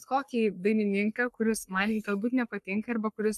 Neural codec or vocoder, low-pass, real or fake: codec, 44.1 kHz, 3.4 kbps, Pupu-Codec; 14.4 kHz; fake